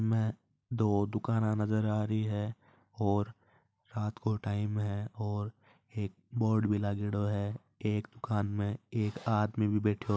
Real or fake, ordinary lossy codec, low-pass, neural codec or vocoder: real; none; none; none